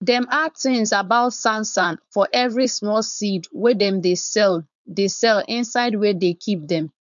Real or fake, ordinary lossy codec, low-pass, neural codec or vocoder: fake; none; 7.2 kHz; codec, 16 kHz, 4.8 kbps, FACodec